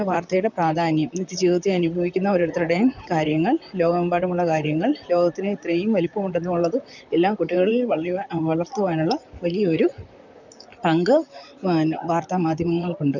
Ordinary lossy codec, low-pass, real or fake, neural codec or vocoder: none; 7.2 kHz; fake; vocoder, 44.1 kHz, 128 mel bands, Pupu-Vocoder